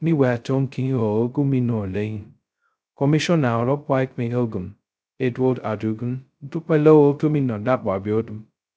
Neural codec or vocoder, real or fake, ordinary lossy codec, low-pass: codec, 16 kHz, 0.2 kbps, FocalCodec; fake; none; none